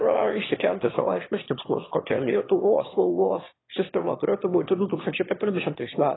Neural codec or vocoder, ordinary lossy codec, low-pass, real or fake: autoencoder, 22.05 kHz, a latent of 192 numbers a frame, VITS, trained on one speaker; AAC, 16 kbps; 7.2 kHz; fake